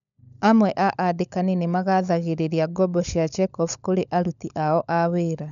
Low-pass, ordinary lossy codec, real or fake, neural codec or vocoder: 7.2 kHz; none; fake; codec, 16 kHz, 16 kbps, FunCodec, trained on LibriTTS, 50 frames a second